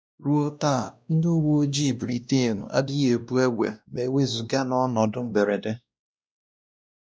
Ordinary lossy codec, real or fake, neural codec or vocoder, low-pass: none; fake; codec, 16 kHz, 1 kbps, X-Codec, WavLM features, trained on Multilingual LibriSpeech; none